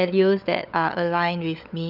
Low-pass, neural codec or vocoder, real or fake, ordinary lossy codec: 5.4 kHz; codec, 16 kHz, 16 kbps, FunCodec, trained on LibriTTS, 50 frames a second; fake; none